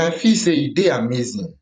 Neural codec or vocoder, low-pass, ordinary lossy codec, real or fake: vocoder, 44.1 kHz, 128 mel bands every 256 samples, BigVGAN v2; 10.8 kHz; none; fake